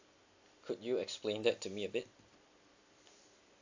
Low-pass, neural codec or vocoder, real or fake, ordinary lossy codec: 7.2 kHz; none; real; AAC, 48 kbps